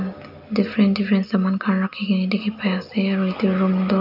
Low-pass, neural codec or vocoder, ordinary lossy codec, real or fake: 5.4 kHz; none; none; real